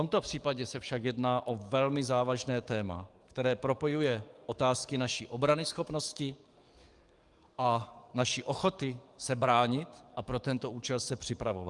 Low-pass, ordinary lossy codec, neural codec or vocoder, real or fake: 10.8 kHz; Opus, 32 kbps; codec, 44.1 kHz, 7.8 kbps, DAC; fake